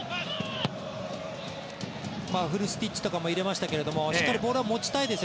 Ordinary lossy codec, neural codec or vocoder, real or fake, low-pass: none; none; real; none